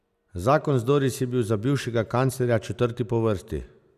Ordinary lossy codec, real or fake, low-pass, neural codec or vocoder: none; real; 14.4 kHz; none